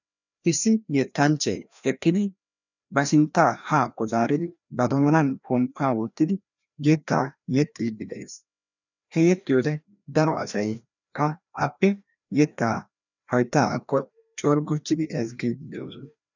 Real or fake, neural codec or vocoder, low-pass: fake; codec, 16 kHz, 1 kbps, FreqCodec, larger model; 7.2 kHz